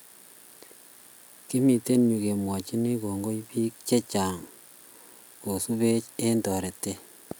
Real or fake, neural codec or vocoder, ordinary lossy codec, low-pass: real; none; none; none